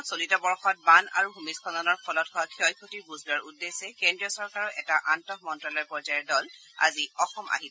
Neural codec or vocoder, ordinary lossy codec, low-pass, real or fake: none; none; none; real